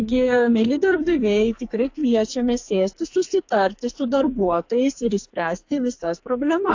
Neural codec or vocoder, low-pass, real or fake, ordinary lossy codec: codec, 44.1 kHz, 2.6 kbps, SNAC; 7.2 kHz; fake; AAC, 48 kbps